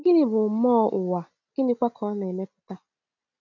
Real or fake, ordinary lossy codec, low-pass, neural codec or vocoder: real; none; 7.2 kHz; none